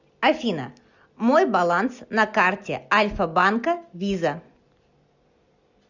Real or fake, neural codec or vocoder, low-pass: real; none; 7.2 kHz